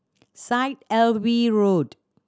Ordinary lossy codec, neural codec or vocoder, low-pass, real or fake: none; none; none; real